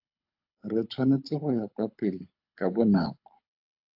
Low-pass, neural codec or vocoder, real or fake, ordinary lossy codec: 5.4 kHz; codec, 24 kHz, 6 kbps, HILCodec; fake; AAC, 48 kbps